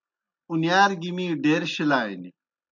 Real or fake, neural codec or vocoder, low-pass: real; none; 7.2 kHz